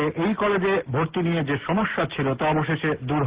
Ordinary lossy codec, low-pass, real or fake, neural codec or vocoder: Opus, 16 kbps; 3.6 kHz; real; none